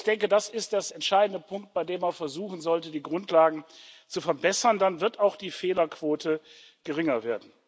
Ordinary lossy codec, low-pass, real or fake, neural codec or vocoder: none; none; real; none